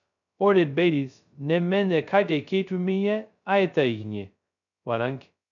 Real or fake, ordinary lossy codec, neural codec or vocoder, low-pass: fake; none; codec, 16 kHz, 0.2 kbps, FocalCodec; 7.2 kHz